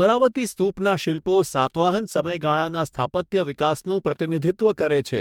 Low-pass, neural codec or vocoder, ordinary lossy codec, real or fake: 19.8 kHz; codec, 44.1 kHz, 2.6 kbps, DAC; MP3, 96 kbps; fake